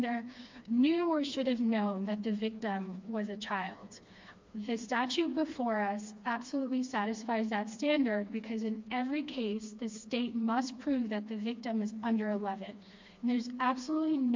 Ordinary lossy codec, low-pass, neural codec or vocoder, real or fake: MP3, 48 kbps; 7.2 kHz; codec, 16 kHz, 2 kbps, FreqCodec, smaller model; fake